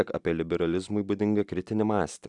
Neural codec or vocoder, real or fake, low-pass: none; real; 10.8 kHz